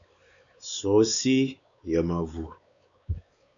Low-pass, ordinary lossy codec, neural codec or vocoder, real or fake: 7.2 kHz; MP3, 96 kbps; codec, 16 kHz, 4 kbps, X-Codec, WavLM features, trained on Multilingual LibriSpeech; fake